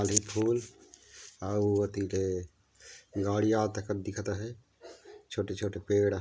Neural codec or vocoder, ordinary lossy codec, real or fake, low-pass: none; none; real; none